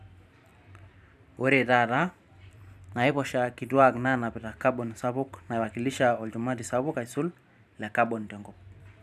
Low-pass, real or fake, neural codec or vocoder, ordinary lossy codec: 14.4 kHz; fake; vocoder, 44.1 kHz, 128 mel bands every 512 samples, BigVGAN v2; none